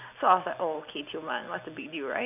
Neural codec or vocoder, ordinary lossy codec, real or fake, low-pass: none; none; real; 3.6 kHz